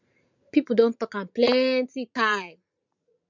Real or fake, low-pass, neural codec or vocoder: fake; 7.2 kHz; vocoder, 44.1 kHz, 80 mel bands, Vocos